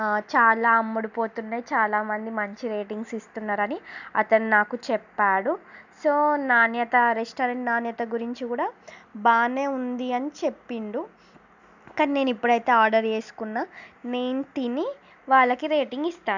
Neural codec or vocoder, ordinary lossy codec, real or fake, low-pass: none; none; real; 7.2 kHz